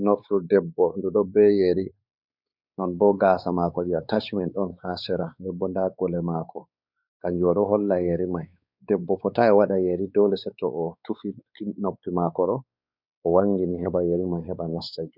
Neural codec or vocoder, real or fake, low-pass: codec, 16 kHz, 4 kbps, X-Codec, WavLM features, trained on Multilingual LibriSpeech; fake; 5.4 kHz